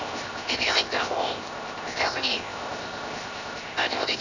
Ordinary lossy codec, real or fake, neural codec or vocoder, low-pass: none; fake; codec, 16 kHz, 0.7 kbps, FocalCodec; 7.2 kHz